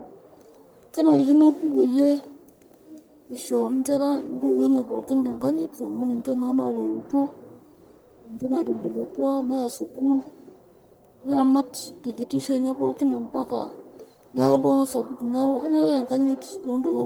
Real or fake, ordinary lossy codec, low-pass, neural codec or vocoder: fake; none; none; codec, 44.1 kHz, 1.7 kbps, Pupu-Codec